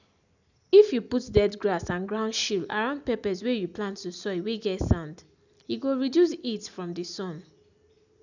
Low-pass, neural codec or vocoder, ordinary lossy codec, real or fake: 7.2 kHz; none; none; real